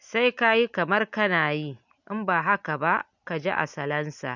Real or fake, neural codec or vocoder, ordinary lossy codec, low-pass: real; none; none; 7.2 kHz